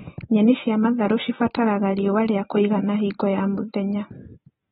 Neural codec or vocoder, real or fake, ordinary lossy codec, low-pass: none; real; AAC, 16 kbps; 19.8 kHz